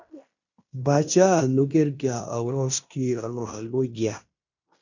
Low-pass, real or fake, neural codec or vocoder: 7.2 kHz; fake; codec, 16 kHz in and 24 kHz out, 0.9 kbps, LongCat-Audio-Codec, fine tuned four codebook decoder